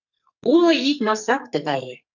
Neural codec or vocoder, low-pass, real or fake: codec, 44.1 kHz, 2.6 kbps, SNAC; 7.2 kHz; fake